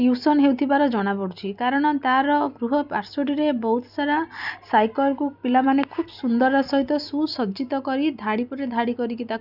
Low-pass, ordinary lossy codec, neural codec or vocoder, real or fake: 5.4 kHz; none; none; real